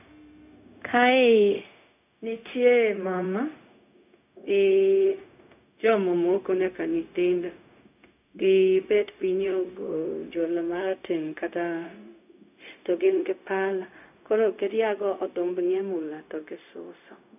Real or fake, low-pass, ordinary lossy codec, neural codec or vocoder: fake; 3.6 kHz; none; codec, 16 kHz, 0.4 kbps, LongCat-Audio-Codec